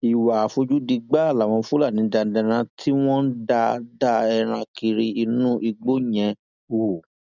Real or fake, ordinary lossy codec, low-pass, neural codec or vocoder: real; none; 7.2 kHz; none